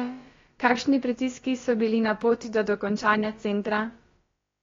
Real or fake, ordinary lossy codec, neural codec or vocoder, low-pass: fake; AAC, 32 kbps; codec, 16 kHz, about 1 kbps, DyCAST, with the encoder's durations; 7.2 kHz